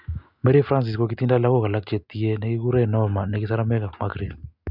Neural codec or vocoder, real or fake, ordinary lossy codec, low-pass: none; real; none; 5.4 kHz